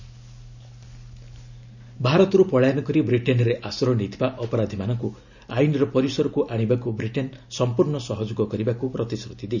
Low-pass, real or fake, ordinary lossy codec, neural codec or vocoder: 7.2 kHz; real; none; none